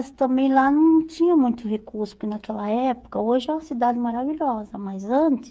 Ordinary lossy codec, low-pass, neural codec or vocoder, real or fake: none; none; codec, 16 kHz, 8 kbps, FreqCodec, smaller model; fake